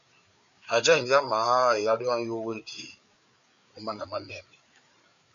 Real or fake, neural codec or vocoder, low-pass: fake; codec, 16 kHz, 4 kbps, FreqCodec, larger model; 7.2 kHz